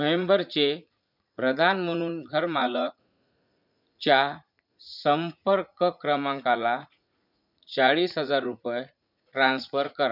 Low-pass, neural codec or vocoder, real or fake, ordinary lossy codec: 5.4 kHz; vocoder, 44.1 kHz, 80 mel bands, Vocos; fake; none